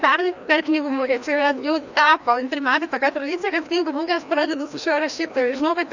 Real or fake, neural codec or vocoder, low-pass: fake; codec, 16 kHz, 1 kbps, FreqCodec, larger model; 7.2 kHz